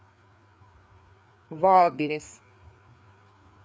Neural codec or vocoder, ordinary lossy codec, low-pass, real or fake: codec, 16 kHz, 4 kbps, FreqCodec, larger model; none; none; fake